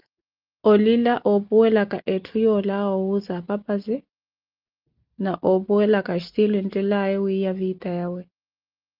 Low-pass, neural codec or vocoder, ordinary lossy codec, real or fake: 5.4 kHz; none; Opus, 24 kbps; real